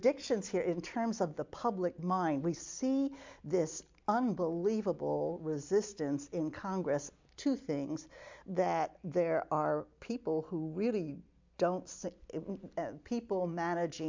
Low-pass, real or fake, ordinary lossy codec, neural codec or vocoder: 7.2 kHz; real; MP3, 64 kbps; none